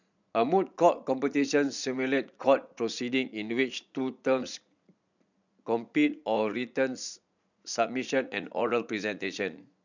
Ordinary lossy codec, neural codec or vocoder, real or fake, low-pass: none; vocoder, 44.1 kHz, 80 mel bands, Vocos; fake; 7.2 kHz